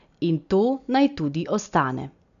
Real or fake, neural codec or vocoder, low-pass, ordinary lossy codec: real; none; 7.2 kHz; none